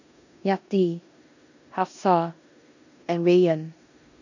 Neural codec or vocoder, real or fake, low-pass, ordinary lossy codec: codec, 16 kHz in and 24 kHz out, 0.9 kbps, LongCat-Audio-Codec, four codebook decoder; fake; 7.2 kHz; none